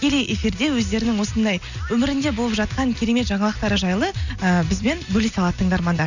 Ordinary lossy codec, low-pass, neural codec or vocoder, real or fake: none; 7.2 kHz; none; real